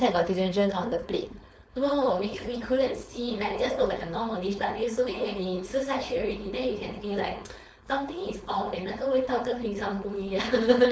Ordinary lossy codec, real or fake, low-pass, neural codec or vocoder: none; fake; none; codec, 16 kHz, 4.8 kbps, FACodec